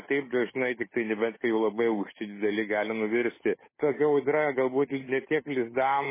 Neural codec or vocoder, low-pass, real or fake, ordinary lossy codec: codec, 16 kHz, 8 kbps, FunCodec, trained on Chinese and English, 25 frames a second; 3.6 kHz; fake; MP3, 16 kbps